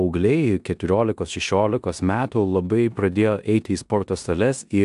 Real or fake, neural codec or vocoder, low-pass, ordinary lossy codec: fake; codec, 16 kHz in and 24 kHz out, 0.9 kbps, LongCat-Audio-Codec, four codebook decoder; 10.8 kHz; AAC, 96 kbps